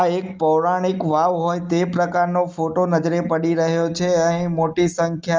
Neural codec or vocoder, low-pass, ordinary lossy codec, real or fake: none; 7.2 kHz; Opus, 24 kbps; real